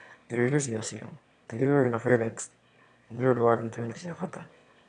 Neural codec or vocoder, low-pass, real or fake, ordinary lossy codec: autoencoder, 22.05 kHz, a latent of 192 numbers a frame, VITS, trained on one speaker; 9.9 kHz; fake; AAC, 96 kbps